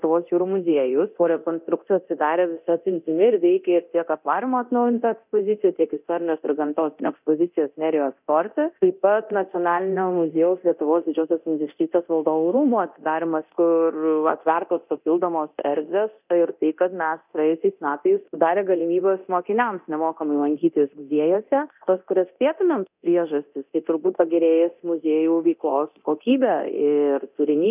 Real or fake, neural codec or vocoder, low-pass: fake; codec, 24 kHz, 0.9 kbps, DualCodec; 3.6 kHz